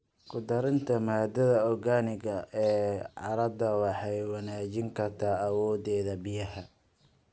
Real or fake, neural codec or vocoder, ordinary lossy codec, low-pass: real; none; none; none